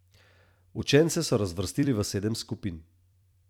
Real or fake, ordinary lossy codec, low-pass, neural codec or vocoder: fake; none; 19.8 kHz; vocoder, 44.1 kHz, 128 mel bands every 256 samples, BigVGAN v2